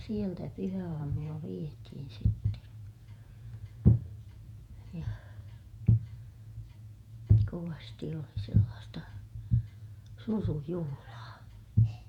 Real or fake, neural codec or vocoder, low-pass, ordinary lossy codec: fake; vocoder, 48 kHz, 128 mel bands, Vocos; 19.8 kHz; none